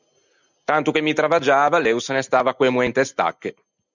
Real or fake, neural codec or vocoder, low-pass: real; none; 7.2 kHz